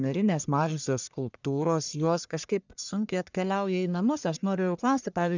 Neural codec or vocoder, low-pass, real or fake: codec, 44.1 kHz, 1.7 kbps, Pupu-Codec; 7.2 kHz; fake